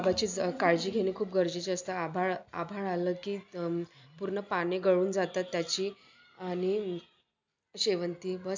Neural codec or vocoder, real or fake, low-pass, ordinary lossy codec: none; real; 7.2 kHz; MP3, 64 kbps